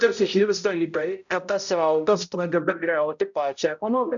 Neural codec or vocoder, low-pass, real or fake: codec, 16 kHz, 0.5 kbps, X-Codec, HuBERT features, trained on balanced general audio; 7.2 kHz; fake